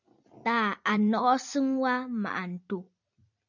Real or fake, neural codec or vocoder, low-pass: fake; vocoder, 44.1 kHz, 128 mel bands every 256 samples, BigVGAN v2; 7.2 kHz